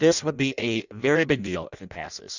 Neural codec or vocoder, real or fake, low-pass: codec, 16 kHz in and 24 kHz out, 0.6 kbps, FireRedTTS-2 codec; fake; 7.2 kHz